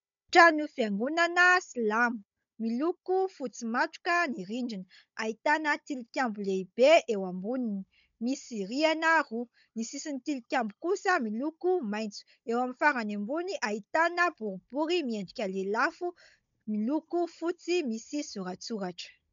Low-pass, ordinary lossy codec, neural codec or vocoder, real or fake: 7.2 kHz; MP3, 64 kbps; codec, 16 kHz, 16 kbps, FunCodec, trained on Chinese and English, 50 frames a second; fake